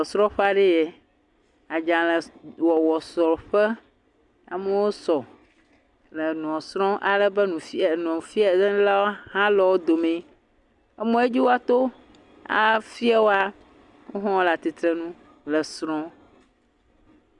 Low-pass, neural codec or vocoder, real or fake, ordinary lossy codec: 10.8 kHz; none; real; Opus, 64 kbps